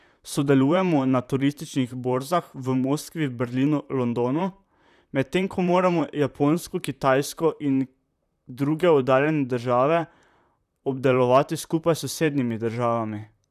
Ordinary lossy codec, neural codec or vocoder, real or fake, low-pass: none; vocoder, 44.1 kHz, 128 mel bands, Pupu-Vocoder; fake; 14.4 kHz